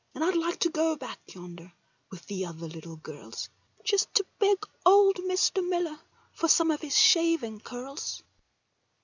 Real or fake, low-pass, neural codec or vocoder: real; 7.2 kHz; none